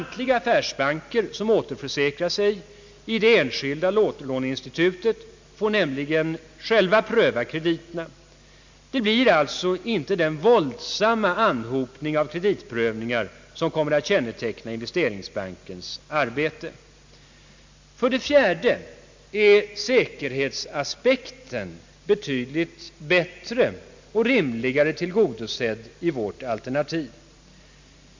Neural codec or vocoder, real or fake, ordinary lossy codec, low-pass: none; real; MP3, 64 kbps; 7.2 kHz